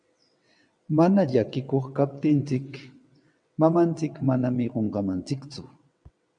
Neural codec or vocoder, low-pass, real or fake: vocoder, 22.05 kHz, 80 mel bands, WaveNeXt; 9.9 kHz; fake